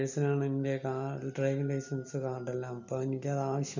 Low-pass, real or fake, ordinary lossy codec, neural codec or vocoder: 7.2 kHz; real; none; none